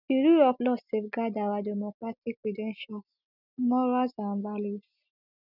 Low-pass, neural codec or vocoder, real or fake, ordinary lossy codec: 5.4 kHz; none; real; none